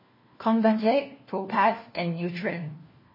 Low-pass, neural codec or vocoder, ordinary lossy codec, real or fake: 5.4 kHz; codec, 16 kHz, 1 kbps, FunCodec, trained on LibriTTS, 50 frames a second; MP3, 24 kbps; fake